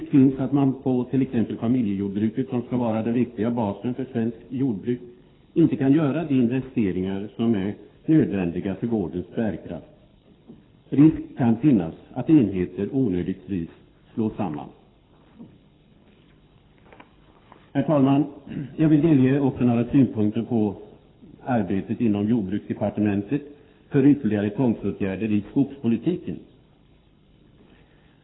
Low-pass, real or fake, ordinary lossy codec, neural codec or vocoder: 7.2 kHz; fake; AAC, 16 kbps; codec, 24 kHz, 6 kbps, HILCodec